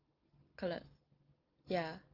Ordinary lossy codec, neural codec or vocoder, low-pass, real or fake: Opus, 24 kbps; none; 5.4 kHz; real